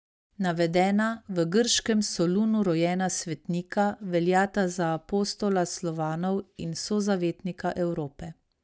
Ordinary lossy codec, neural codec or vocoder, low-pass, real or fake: none; none; none; real